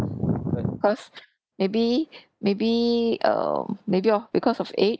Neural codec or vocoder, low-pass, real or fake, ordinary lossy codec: none; none; real; none